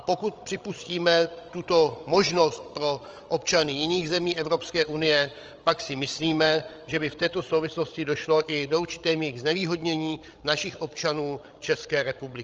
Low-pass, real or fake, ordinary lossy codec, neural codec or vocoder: 7.2 kHz; fake; Opus, 24 kbps; codec, 16 kHz, 16 kbps, FreqCodec, larger model